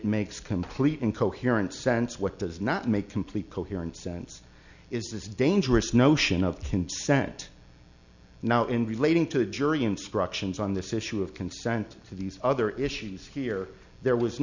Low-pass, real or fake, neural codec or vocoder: 7.2 kHz; real; none